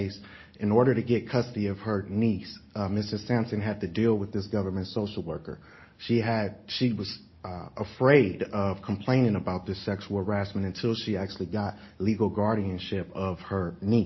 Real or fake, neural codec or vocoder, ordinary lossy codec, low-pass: real; none; MP3, 24 kbps; 7.2 kHz